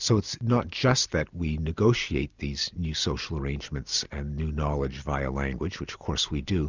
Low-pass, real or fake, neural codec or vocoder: 7.2 kHz; real; none